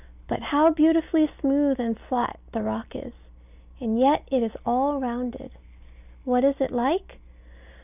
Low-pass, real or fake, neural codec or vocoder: 3.6 kHz; real; none